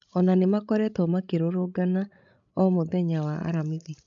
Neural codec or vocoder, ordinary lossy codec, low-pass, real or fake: codec, 16 kHz, 16 kbps, FreqCodec, larger model; MP3, 64 kbps; 7.2 kHz; fake